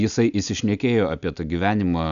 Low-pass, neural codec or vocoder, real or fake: 7.2 kHz; none; real